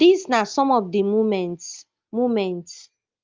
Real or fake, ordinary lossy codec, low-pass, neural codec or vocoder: real; Opus, 32 kbps; 7.2 kHz; none